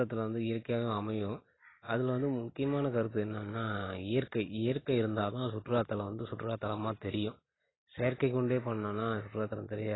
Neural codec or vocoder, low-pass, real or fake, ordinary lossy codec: none; 7.2 kHz; real; AAC, 16 kbps